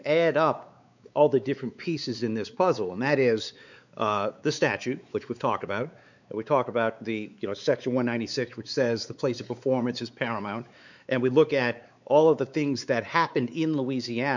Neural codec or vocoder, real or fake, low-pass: codec, 16 kHz, 4 kbps, X-Codec, WavLM features, trained on Multilingual LibriSpeech; fake; 7.2 kHz